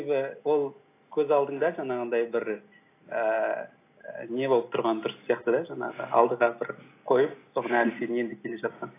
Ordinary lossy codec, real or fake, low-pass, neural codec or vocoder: AAC, 24 kbps; real; 3.6 kHz; none